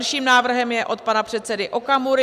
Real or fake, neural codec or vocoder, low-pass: real; none; 14.4 kHz